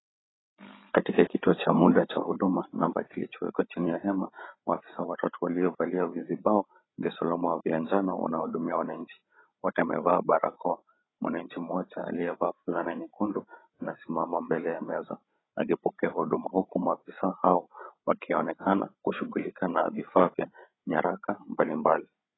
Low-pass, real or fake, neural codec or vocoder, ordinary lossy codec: 7.2 kHz; fake; codec, 16 kHz, 8 kbps, FreqCodec, larger model; AAC, 16 kbps